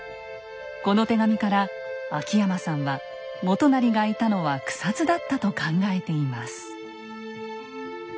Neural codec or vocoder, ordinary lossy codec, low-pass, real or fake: none; none; none; real